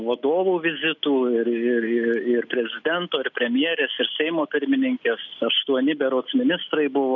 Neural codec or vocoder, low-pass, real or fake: none; 7.2 kHz; real